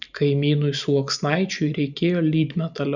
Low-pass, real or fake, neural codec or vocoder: 7.2 kHz; real; none